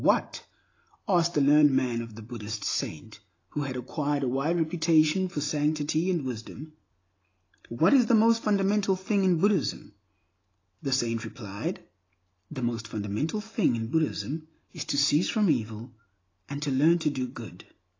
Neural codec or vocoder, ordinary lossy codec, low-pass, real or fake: none; AAC, 32 kbps; 7.2 kHz; real